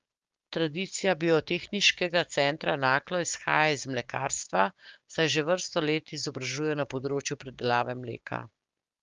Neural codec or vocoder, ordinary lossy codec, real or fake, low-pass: codec, 16 kHz, 6 kbps, DAC; Opus, 32 kbps; fake; 7.2 kHz